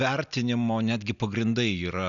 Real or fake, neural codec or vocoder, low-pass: real; none; 7.2 kHz